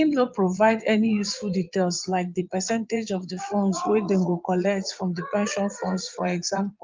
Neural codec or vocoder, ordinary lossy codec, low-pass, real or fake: vocoder, 22.05 kHz, 80 mel bands, Vocos; Opus, 32 kbps; 7.2 kHz; fake